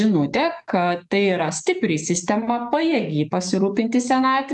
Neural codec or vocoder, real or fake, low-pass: codec, 44.1 kHz, 7.8 kbps, DAC; fake; 10.8 kHz